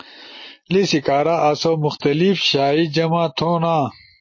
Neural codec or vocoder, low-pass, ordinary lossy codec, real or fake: none; 7.2 kHz; MP3, 32 kbps; real